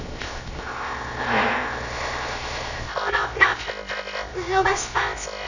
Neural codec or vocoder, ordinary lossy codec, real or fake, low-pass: codec, 16 kHz, 0.3 kbps, FocalCodec; none; fake; 7.2 kHz